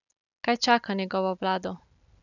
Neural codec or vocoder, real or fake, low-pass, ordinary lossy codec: none; real; 7.2 kHz; none